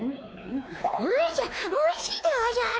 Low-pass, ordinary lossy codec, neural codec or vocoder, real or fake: none; none; codec, 16 kHz, 2 kbps, X-Codec, WavLM features, trained on Multilingual LibriSpeech; fake